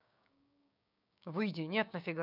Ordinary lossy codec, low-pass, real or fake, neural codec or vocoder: none; 5.4 kHz; fake; codec, 16 kHz, 6 kbps, DAC